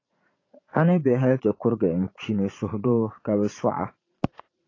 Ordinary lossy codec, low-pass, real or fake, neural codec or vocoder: AAC, 32 kbps; 7.2 kHz; fake; vocoder, 44.1 kHz, 80 mel bands, Vocos